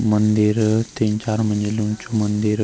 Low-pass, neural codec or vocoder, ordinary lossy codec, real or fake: none; none; none; real